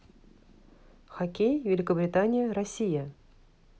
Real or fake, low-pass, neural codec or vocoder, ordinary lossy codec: real; none; none; none